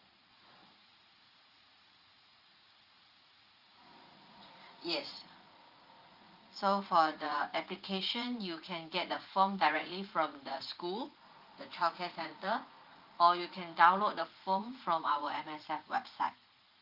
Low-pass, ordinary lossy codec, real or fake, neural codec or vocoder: 5.4 kHz; Opus, 24 kbps; fake; vocoder, 44.1 kHz, 80 mel bands, Vocos